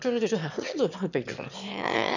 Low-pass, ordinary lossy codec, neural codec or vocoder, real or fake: 7.2 kHz; none; autoencoder, 22.05 kHz, a latent of 192 numbers a frame, VITS, trained on one speaker; fake